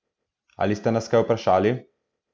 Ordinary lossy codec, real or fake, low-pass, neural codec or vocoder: none; real; none; none